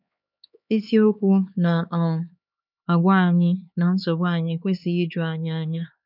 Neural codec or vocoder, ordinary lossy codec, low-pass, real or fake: codec, 16 kHz, 4 kbps, X-Codec, HuBERT features, trained on LibriSpeech; none; 5.4 kHz; fake